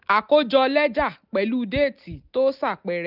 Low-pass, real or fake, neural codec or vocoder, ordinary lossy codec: 5.4 kHz; real; none; none